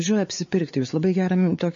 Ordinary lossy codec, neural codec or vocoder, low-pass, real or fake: MP3, 32 kbps; codec, 16 kHz, 4 kbps, X-Codec, WavLM features, trained on Multilingual LibriSpeech; 7.2 kHz; fake